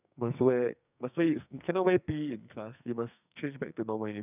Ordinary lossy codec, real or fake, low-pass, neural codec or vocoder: none; fake; 3.6 kHz; codec, 44.1 kHz, 2.6 kbps, SNAC